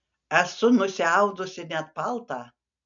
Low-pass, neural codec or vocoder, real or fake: 7.2 kHz; none; real